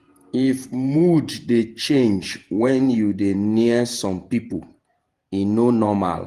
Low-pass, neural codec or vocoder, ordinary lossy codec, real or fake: 14.4 kHz; vocoder, 48 kHz, 128 mel bands, Vocos; Opus, 24 kbps; fake